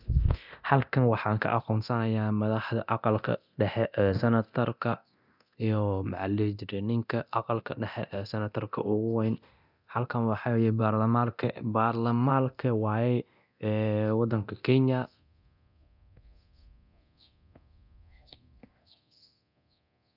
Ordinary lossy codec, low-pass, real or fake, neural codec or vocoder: none; 5.4 kHz; fake; codec, 24 kHz, 0.9 kbps, DualCodec